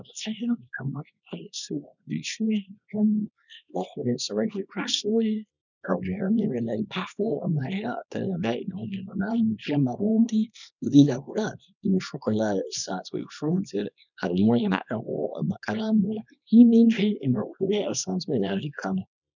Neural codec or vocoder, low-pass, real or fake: codec, 24 kHz, 0.9 kbps, WavTokenizer, small release; 7.2 kHz; fake